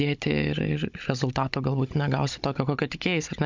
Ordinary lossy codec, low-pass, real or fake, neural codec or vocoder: MP3, 64 kbps; 7.2 kHz; fake; codec, 16 kHz, 16 kbps, FunCodec, trained on Chinese and English, 50 frames a second